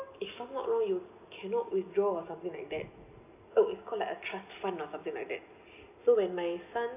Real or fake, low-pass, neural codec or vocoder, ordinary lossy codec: real; 3.6 kHz; none; none